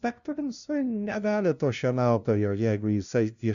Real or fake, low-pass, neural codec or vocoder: fake; 7.2 kHz; codec, 16 kHz, 0.5 kbps, FunCodec, trained on LibriTTS, 25 frames a second